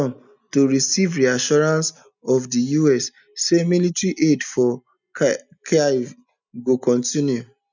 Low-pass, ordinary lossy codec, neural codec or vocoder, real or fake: 7.2 kHz; none; none; real